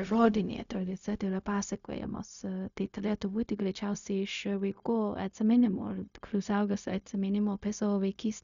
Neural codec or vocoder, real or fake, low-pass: codec, 16 kHz, 0.4 kbps, LongCat-Audio-Codec; fake; 7.2 kHz